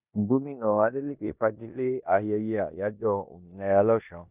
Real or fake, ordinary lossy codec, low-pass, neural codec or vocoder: fake; none; 3.6 kHz; codec, 16 kHz in and 24 kHz out, 0.9 kbps, LongCat-Audio-Codec, four codebook decoder